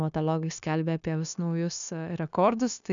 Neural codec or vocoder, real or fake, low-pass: codec, 16 kHz, 0.9 kbps, LongCat-Audio-Codec; fake; 7.2 kHz